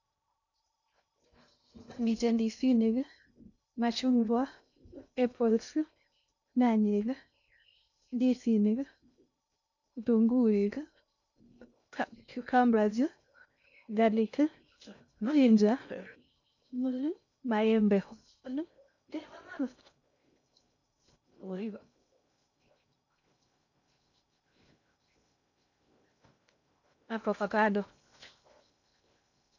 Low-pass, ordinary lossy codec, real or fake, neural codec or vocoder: 7.2 kHz; none; fake; codec, 16 kHz in and 24 kHz out, 0.6 kbps, FocalCodec, streaming, 2048 codes